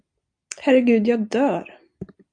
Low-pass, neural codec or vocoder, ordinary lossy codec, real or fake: 9.9 kHz; vocoder, 44.1 kHz, 128 mel bands every 256 samples, BigVGAN v2; AAC, 64 kbps; fake